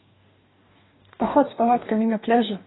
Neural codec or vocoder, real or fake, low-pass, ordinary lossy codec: codec, 44.1 kHz, 2.6 kbps, DAC; fake; 7.2 kHz; AAC, 16 kbps